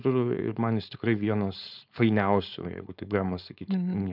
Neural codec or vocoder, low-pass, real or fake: codec, 16 kHz, 4.8 kbps, FACodec; 5.4 kHz; fake